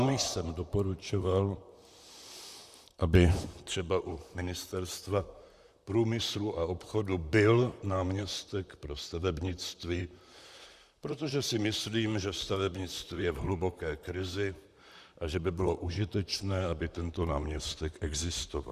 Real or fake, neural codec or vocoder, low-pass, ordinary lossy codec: fake; vocoder, 44.1 kHz, 128 mel bands, Pupu-Vocoder; 14.4 kHz; Opus, 64 kbps